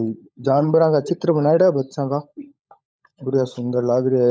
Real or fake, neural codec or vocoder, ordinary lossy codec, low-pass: fake; codec, 16 kHz, 16 kbps, FunCodec, trained on LibriTTS, 50 frames a second; none; none